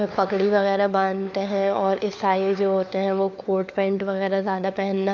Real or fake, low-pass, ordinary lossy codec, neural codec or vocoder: fake; 7.2 kHz; none; codec, 16 kHz, 4 kbps, FunCodec, trained on LibriTTS, 50 frames a second